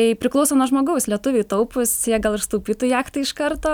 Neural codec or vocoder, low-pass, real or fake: none; 19.8 kHz; real